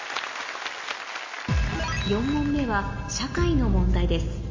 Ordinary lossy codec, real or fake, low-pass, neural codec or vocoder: MP3, 32 kbps; real; 7.2 kHz; none